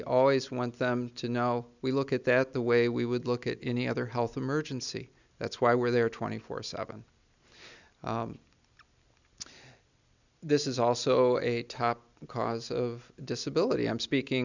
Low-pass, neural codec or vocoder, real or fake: 7.2 kHz; none; real